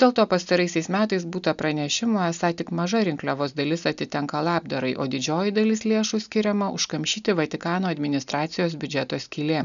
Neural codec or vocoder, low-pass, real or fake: none; 7.2 kHz; real